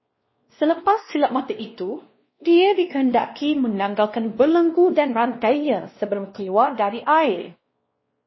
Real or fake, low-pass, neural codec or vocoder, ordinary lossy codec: fake; 7.2 kHz; codec, 16 kHz, 1 kbps, X-Codec, WavLM features, trained on Multilingual LibriSpeech; MP3, 24 kbps